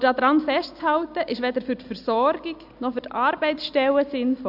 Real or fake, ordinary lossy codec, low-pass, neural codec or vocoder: real; AAC, 48 kbps; 5.4 kHz; none